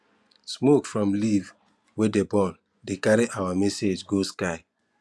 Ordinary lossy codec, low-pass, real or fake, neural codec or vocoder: none; none; fake; vocoder, 24 kHz, 100 mel bands, Vocos